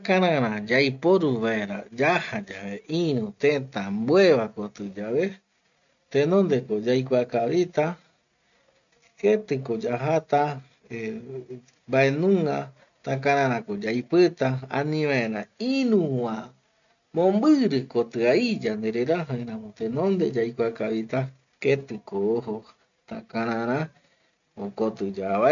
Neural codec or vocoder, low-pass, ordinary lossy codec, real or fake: none; 7.2 kHz; none; real